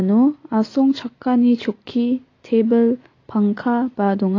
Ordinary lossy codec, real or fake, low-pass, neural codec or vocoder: AAC, 32 kbps; real; 7.2 kHz; none